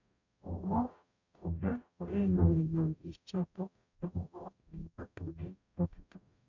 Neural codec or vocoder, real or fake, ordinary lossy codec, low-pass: codec, 44.1 kHz, 0.9 kbps, DAC; fake; none; 7.2 kHz